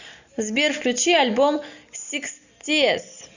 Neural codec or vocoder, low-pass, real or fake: none; 7.2 kHz; real